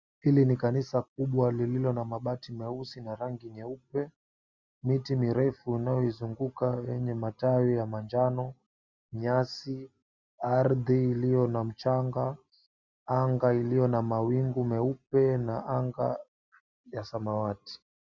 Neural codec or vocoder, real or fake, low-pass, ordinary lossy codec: none; real; 7.2 kHz; Opus, 64 kbps